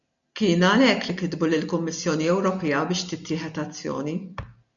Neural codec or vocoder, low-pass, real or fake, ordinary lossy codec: none; 7.2 kHz; real; MP3, 96 kbps